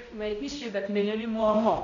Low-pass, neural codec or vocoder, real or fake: 7.2 kHz; codec, 16 kHz, 0.5 kbps, X-Codec, HuBERT features, trained on balanced general audio; fake